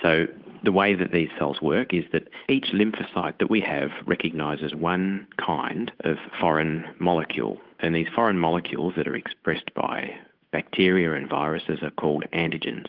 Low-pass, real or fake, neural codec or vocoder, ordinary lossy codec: 5.4 kHz; fake; codec, 16 kHz, 8 kbps, FunCodec, trained on Chinese and English, 25 frames a second; Opus, 32 kbps